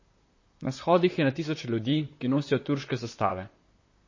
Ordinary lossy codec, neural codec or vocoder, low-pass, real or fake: MP3, 32 kbps; vocoder, 44.1 kHz, 128 mel bands, Pupu-Vocoder; 7.2 kHz; fake